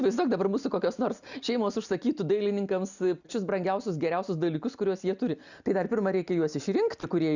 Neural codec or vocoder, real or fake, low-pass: none; real; 7.2 kHz